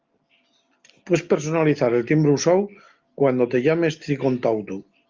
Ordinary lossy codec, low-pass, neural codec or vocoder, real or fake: Opus, 32 kbps; 7.2 kHz; none; real